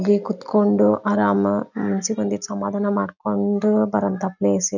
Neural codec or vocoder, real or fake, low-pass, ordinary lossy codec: none; real; 7.2 kHz; none